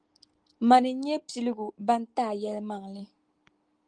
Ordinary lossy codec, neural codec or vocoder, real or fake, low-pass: Opus, 24 kbps; none; real; 9.9 kHz